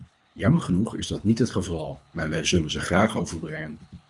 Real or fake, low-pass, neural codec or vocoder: fake; 10.8 kHz; codec, 24 kHz, 3 kbps, HILCodec